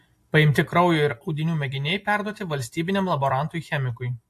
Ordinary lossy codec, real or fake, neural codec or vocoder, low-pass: AAC, 64 kbps; real; none; 14.4 kHz